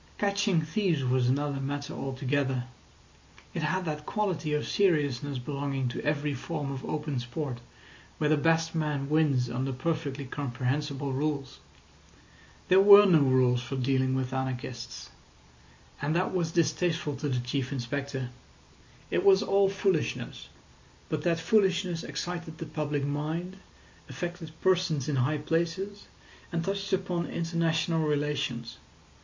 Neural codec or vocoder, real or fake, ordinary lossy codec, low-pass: none; real; MP3, 48 kbps; 7.2 kHz